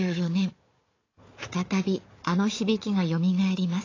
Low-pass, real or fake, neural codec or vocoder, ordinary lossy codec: 7.2 kHz; fake; codec, 16 kHz, 4 kbps, FunCodec, trained on Chinese and English, 50 frames a second; AAC, 32 kbps